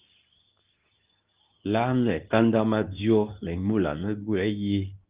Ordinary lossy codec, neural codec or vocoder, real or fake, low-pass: Opus, 32 kbps; codec, 24 kHz, 0.9 kbps, WavTokenizer, medium speech release version 2; fake; 3.6 kHz